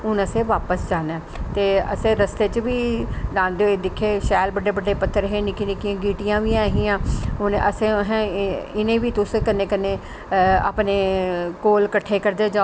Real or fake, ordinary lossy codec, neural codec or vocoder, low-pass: real; none; none; none